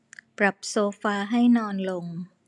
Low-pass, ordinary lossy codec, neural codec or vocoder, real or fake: 10.8 kHz; none; none; real